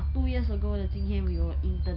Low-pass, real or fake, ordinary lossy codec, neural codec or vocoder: 5.4 kHz; real; none; none